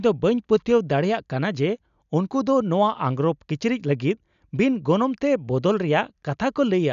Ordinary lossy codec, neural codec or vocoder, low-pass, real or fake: none; none; 7.2 kHz; real